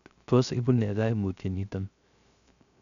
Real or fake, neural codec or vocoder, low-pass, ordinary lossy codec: fake; codec, 16 kHz, 0.3 kbps, FocalCodec; 7.2 kHz; none